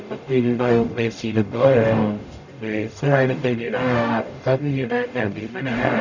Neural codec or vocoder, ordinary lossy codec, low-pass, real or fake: codec, 44.1 kHz, 0.9 kbps, DAC; none; 7.2 kHz; fake